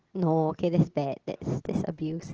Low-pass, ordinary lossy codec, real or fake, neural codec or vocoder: 7.2 kHz; Opus, 16 kbps; real; none